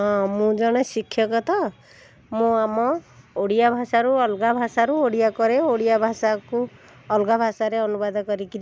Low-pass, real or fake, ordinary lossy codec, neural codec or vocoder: none; real; none; none